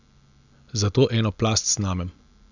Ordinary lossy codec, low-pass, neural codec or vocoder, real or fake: none; 7.2 kHz; none; real